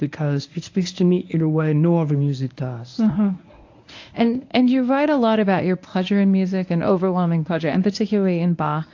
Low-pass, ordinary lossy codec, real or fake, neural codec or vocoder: 7.2 kHz; AAC, 48 kbps; fake; codec, 24 kHz, 0.9 kbps, WavTokenizer, medium speech release version 1